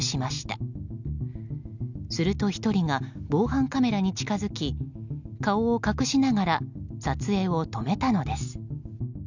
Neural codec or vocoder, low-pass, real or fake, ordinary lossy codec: none; 7.2 kHz; real; none